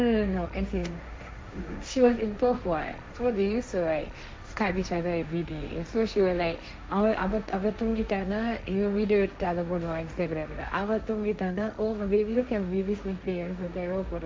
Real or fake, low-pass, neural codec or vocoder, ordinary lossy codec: fake; none; codec, 16 kHz, 1.1 kbps, Voila-Tokenizer; none